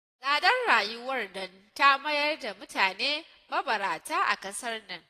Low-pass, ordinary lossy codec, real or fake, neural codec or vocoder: 14.4 kHz; AAC, 48 kbps; real; none